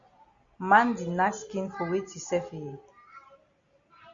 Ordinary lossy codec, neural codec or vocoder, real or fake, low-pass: Opus, 64 kbps; none; real; 7.2 kHz